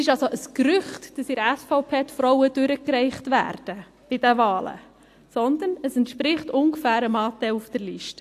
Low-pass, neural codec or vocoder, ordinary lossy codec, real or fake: 14.4 kHz; none; AAC, 64 kbps; real